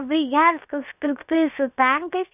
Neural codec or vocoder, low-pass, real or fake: codec, 16 kHz, 0.7 kbps, FocalCodec; 3.6 kHz; fake